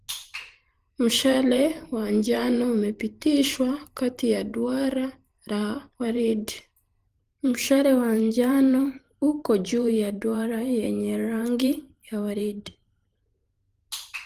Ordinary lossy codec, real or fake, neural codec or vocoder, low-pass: Opus, 24 kbps; fake; vocoder, 44.1 kHz, 128 mel bands every 512 samples, BigVGAN v2; 14.4 kHz